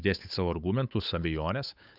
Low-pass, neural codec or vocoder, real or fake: 5.4 kHz; codec, 16 kHz, 8 kbps, FunCodec, trained on Chinese and English, 25 frames a second; fake